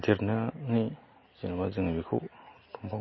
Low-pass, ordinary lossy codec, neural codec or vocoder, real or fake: 7.2 kHz; MP3, 24 kbps; none; real